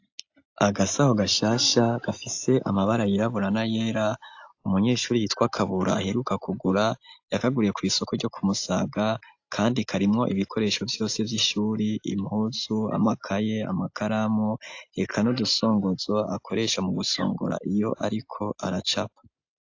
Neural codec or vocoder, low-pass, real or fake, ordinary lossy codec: none; 7.2 kHz; real; AAC, 48 kbps